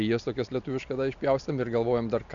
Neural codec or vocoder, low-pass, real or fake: none; 7.2 kHz; real